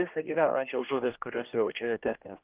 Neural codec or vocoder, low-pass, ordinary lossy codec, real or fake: codec, 16 kHz, 1 kbps, X-Codec, HuBERT features, trained on general audio; 3.6 kHz; Opus, 24 kbps; fake